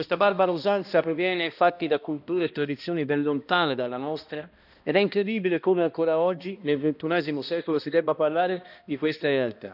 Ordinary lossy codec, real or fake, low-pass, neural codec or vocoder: none; fake; 5.4 kHz; codec, 16 kHz, 1 kbps, X-Codec, HuBERT features, trained on balanced general audio